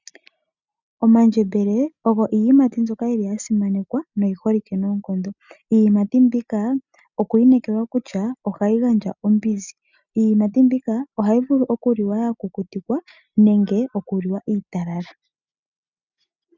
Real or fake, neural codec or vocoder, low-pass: real; none; 7.2 kHz